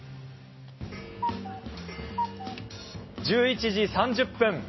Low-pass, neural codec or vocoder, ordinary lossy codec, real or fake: 7.2 kHz; none; MP3, 24 kbps; real